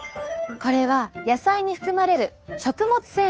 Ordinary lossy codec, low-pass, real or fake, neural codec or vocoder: none; none; fake; codec, 16 kHz, 2 kbps, FunCodec, trained on Chinese and English, 25 frames a second